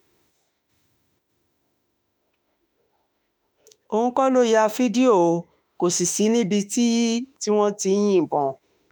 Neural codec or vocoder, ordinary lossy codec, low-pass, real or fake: autoencoder, 48 kHz, 32 numbers a frame, DAC-VAE, trained on Japanese speech; none; none; fake